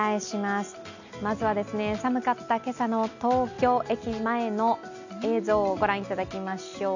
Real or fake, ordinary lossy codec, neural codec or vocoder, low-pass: real; none; none; 7.2 kHz